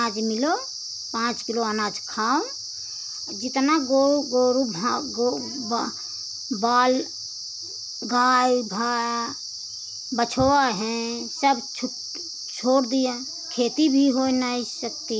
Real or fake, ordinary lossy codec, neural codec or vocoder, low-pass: real; none; none; none